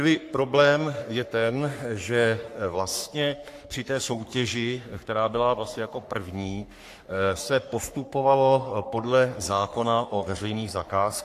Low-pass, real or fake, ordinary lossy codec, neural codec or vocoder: 14.4 kHz; fake; AAC, 64 kbps; codec, 44.1 kHz, 3.4 kbps, Pupu-Codec